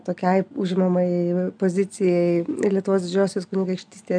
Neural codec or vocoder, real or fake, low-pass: none; real; 9.9 kHz